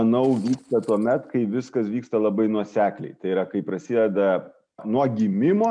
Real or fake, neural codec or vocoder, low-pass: real; none; 9.9 kHz